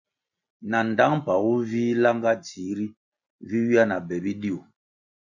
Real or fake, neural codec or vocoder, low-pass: real; none; 7.2 kHz